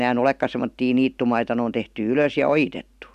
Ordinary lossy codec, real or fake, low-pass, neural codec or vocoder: none; real; 14.4 kHz; none